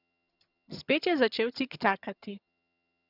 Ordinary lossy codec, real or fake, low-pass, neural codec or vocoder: none; fake; 5.4 kHz; vocoder, 22.05 kHz, 80 mel bands, HiFi-GAN